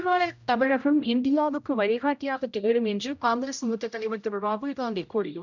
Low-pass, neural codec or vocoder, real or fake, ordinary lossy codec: 7.2 kHz; codec, 16 kHz, 0.5 kbps, X-Codec, HuBERT features, trained on general audio; fake; none